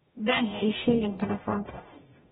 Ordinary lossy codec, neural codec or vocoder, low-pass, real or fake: AAC, 16 kbps; codec, 44.1 kHz, 0.9 kbps, DAC; 19.8 kHz; fake